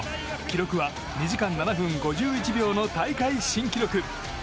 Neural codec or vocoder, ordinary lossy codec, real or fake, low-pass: none; none; real; none